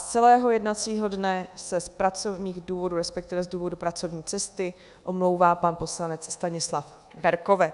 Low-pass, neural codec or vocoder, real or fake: 10.8 kHz; codec, 24 kHz, 1.2 kbps, DualCodec; fake